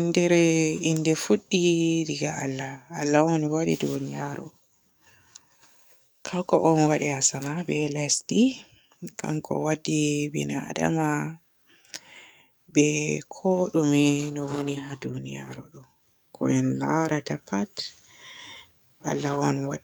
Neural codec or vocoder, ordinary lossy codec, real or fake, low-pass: codec, 44.1 kHz, 7.8 kbps, DAC; none; fake; none